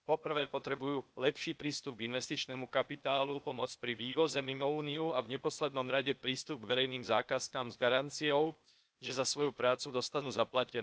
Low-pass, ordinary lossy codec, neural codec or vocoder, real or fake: none; none; codec, 16 kHz, 0.8 kbps, ZipCodec; fake